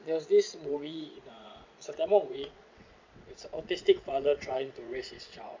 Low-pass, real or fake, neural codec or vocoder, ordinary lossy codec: 7.2 kHz; fake; vocoder, 22.05 kHz, 80 mel bands, WaveNeXt; MP3, 64 kbps